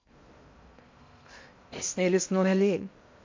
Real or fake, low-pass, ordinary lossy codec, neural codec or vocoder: fake; 7.2 kHz; MP3, 48 kbps; codec, 16 kHz in and 24 kHz out, 0.6 kbps, FocalCodec, streaming, 2048 codes